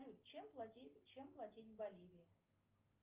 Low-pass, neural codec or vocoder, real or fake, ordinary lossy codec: 3.6 kHz; none; real; Opus, 24 kbps